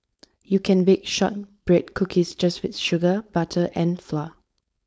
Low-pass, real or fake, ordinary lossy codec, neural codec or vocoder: none; fake; none; codec, 16 kHz, 4.8 kbps, FACodec